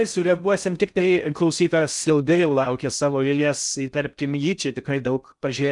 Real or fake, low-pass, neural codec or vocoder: fake; 10.8 kHz; codec, 16 kHz in and 24 kHz out, 0.6 kbps, FocalCodec, streaming, 4096 codes